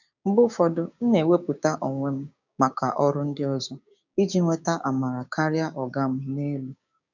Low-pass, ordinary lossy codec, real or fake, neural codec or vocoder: 7.2 kHz; none; fake; codec, 16 kHz, 6 kbps, DAC